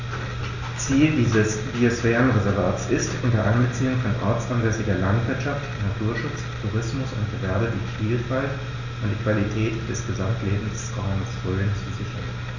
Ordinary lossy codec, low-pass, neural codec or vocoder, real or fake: none; 7.2 kHz; none; real